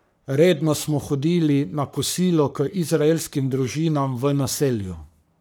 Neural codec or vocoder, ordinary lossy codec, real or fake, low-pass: codec, 44.1 kHz, 3.4 kbps, Pupu-Codec; none; fake; none